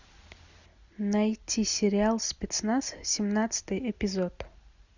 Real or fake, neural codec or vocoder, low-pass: real; none; 7.2 kHz